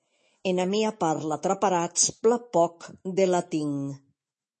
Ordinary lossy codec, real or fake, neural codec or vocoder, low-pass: MP3, 32 kbps; fake; autoencoder, 48 kHz, 128 numbers a frame, DAC-VAE, trained on Japanese speech; 10.8 kHz